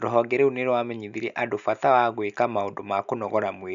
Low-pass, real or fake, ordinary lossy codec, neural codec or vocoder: 7.2 kHz; real; none; none